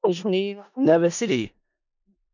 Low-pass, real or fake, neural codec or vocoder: 7.2 kHz; fake; codec, 16 kHz in and 24 kHz out, 0.4 kbps, LongCat-Audio-Codec, four codebook decoder